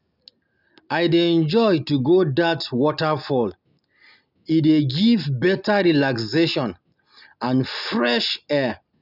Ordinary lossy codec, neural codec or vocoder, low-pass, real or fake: none; none; 5.4 kHz; real